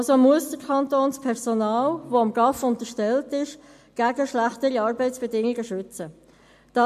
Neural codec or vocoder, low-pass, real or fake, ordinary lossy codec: none; 14.4 kHz; real; MP3, 64 kbps